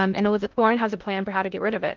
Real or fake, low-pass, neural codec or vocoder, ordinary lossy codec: fake; 7.2 kHz; codec, 16 kHz in and 24 kHz out, 0.6 kbps, FocalCodec, streaming, 2048 codes; Opus, 32 kbps